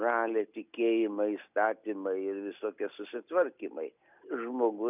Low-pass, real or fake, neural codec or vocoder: 3.6 kHz; real; none